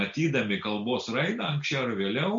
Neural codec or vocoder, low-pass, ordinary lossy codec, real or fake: none; 7.2 kHz; MP3, 48 kbps; real